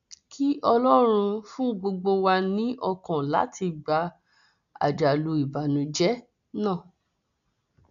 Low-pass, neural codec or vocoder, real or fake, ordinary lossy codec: 7.2 kHz; none; real; none